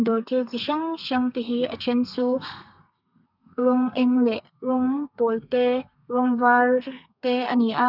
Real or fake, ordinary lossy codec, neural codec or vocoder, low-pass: fake; none; codec, 32 kHz, 1.9 kbps, SNAC; 5.4 kHz